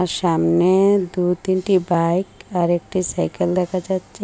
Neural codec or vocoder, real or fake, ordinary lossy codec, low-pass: none; real; none; none